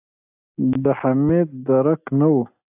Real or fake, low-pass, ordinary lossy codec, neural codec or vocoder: real; 3.6 kHz; AAC, 32 kbps; none